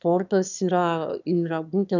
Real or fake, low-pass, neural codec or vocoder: fake; 7.2 kHz; autoencoder, 22.05 kHz, a latent of 192 numbers a frame, VITS, trained on one speaker